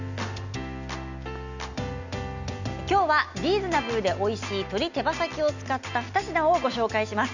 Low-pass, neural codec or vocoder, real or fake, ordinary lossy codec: 7.2 kHz; none; real; none